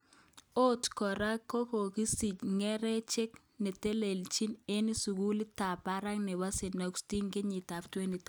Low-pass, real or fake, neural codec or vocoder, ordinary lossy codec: none; real; none; none